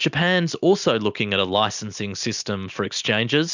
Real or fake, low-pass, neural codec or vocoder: real; 7.2 kHz; none